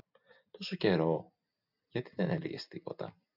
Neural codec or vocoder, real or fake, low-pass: none; real; 5.4 kHz